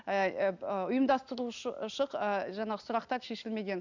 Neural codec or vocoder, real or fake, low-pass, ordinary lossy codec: none; real; 7.2 kHz; none